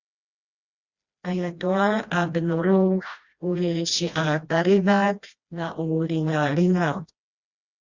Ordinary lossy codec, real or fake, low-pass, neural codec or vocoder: Opus, 64 kbps; fake; 7.2 kHz; codec, 16 kHz, 1 kbps, FreqCodec, smaller model